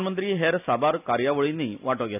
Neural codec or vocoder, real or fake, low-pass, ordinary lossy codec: none; real; 3.6 kHz; none